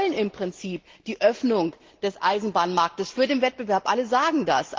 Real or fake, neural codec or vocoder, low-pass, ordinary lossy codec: real; none; 7.2 kHz; Opus, 16 kbps